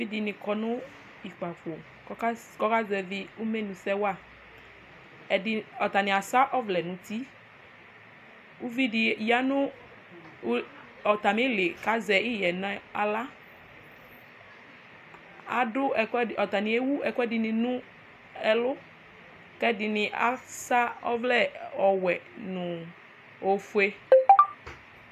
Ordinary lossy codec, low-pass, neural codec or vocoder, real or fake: AAC, 96 kbps; 14.4 kHz; none; real